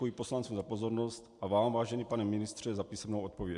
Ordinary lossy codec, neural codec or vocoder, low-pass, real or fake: MP3, 64 kbps; none; 10.8 kHz; real